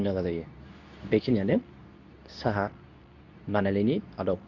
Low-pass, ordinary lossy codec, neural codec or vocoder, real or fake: 7.2 kHz; none; codec, 24 kHz, 0.9 kbps, WavTokenizer, medium speech release version 2; fake